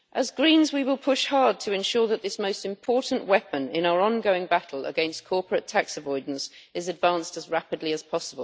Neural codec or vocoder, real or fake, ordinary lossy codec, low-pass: none; real; none; none